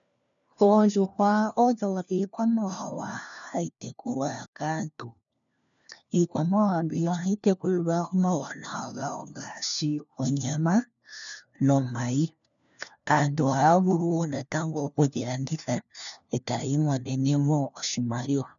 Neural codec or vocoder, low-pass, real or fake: codec, 16 kHz, 1 kbps, FunCodec, trained on LibriTTS, 50 frames a second; 7.2 kHz; fake